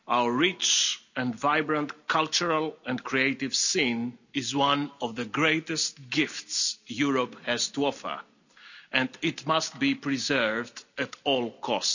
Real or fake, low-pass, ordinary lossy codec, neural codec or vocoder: real; 7.2 kHz; none; none